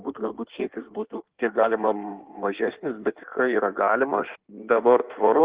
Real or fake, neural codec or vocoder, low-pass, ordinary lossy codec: fake; codec, 16 kHz in and 24 kHz out, 1.1 kbps, FireRedTTS-2 codec; 3.6 kHz; Opus, 16 kbps